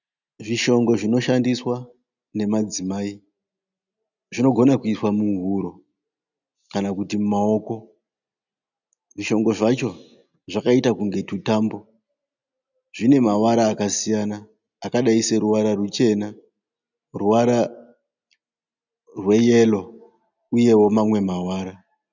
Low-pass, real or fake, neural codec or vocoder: 7.2 kHz; real; none